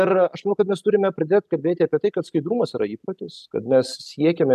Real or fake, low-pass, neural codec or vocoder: real; 14.4 kHz; none